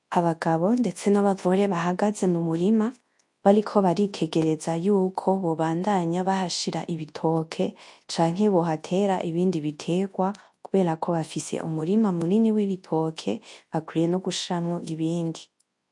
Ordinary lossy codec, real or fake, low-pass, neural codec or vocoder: MP3, 48 kbps; fake; 10.8 kHz; codec, 24 kHz, 0.9 kbps, WavTokenizer, large speech release